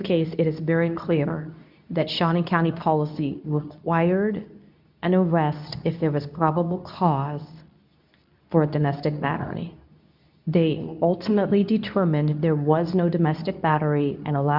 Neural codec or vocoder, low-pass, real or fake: codec, 24 kHz, 0.9 kbps, WavTokenizer, medium speech release version 2; 5.4 kHz; fake